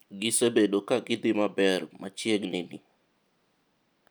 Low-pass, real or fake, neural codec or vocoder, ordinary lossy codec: none; real; none; none